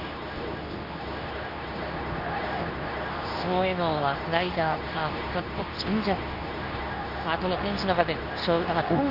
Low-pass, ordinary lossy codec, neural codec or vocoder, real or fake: 5.4 kHz; none; codec, 24 kHz, 0.9 kbps, WavTokenizer, medium speech release version 2; fake